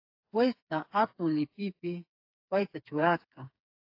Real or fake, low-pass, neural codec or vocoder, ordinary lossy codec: fake; 5.4 kHz; codec, 16 kHz, 4 kbps, FreqCodec, smaller model; AAC, 32 kbps